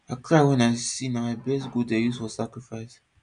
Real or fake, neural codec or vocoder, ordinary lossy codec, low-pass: real; none; none; 9.9 kHz